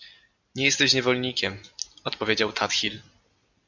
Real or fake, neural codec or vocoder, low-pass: real; none; 7.2 kHz